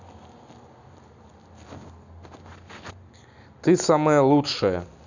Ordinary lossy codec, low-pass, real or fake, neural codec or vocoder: none; 7.2 kHz; real; none